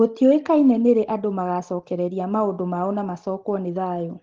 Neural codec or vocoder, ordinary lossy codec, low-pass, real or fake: none; Opus, 16 kbps; 7.2 kHz; real